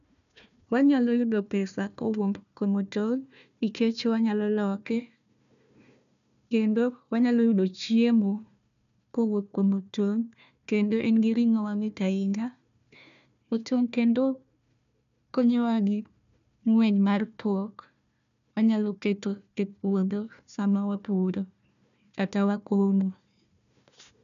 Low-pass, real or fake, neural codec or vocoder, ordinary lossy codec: 7.2 kHz; fake; codec, 16 kHz, 1 kbps, FunCodec, trained on Chinese and English, 50 frames a second; none